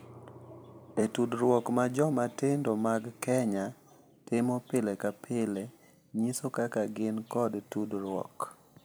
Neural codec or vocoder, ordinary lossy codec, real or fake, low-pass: vocoder, 44.1 kHz, 128 mel bands every 256 samples, BigVGAN v2; none; fake; none